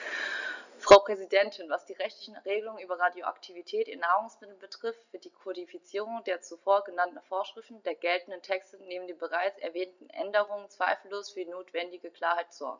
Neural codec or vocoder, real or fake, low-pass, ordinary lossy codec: none; real; 7.2 kHz; none